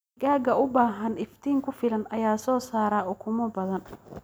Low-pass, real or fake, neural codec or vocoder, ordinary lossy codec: none; real; none; none